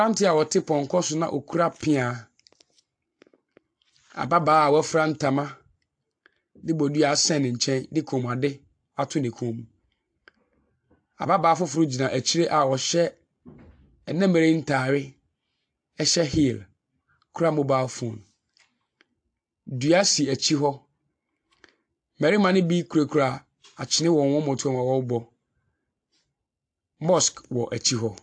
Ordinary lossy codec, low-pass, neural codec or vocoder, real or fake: AAC, 64 kbps; 9.9 kHz; none; real